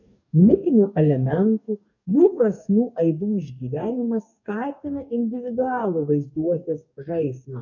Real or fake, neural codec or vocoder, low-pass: fake; codec, 44.1 kHz, 2.6 kbps, DAC; 7.2 kHz